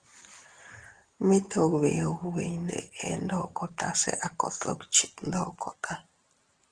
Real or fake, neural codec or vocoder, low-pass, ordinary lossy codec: real; none; 9.9 kHz; Opus, 16 kbps